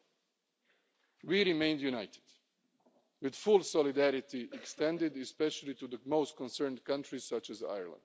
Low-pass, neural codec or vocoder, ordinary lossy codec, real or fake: none; none; none; real